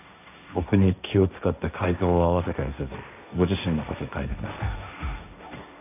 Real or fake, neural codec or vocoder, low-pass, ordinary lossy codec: fake; codec, 16 kHz, 1.1 kbps, Voila-Tokenizer; 3.6 kHz; none